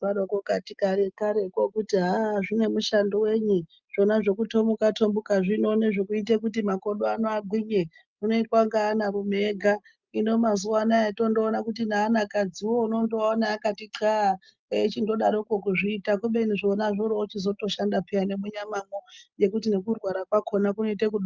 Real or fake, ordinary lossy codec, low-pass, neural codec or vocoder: real; Opus, 24 kbps; 7.2 kHz; none